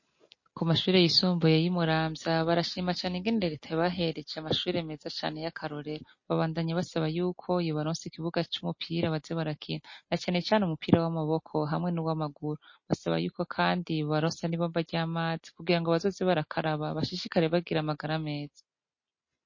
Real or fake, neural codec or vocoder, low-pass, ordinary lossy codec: real; none; 7.2 kHz; MP3, 32 kbps